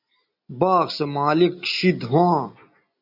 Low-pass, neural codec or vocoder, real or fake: 5.4 kHz; none; real